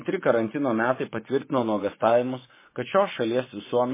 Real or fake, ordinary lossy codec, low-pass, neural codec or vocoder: fake; MP3, 16 kbps; 3.6 kHz; vocoder, 24 kHz, 100 mel bands, Vocos